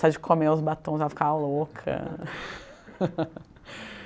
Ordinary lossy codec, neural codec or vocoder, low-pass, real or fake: none; none; none; real